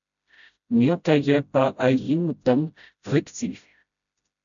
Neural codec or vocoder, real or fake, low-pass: codec, 16 kHz, 0.5 kbps, FreqCodec, smaller model; fake; 7.2 kHz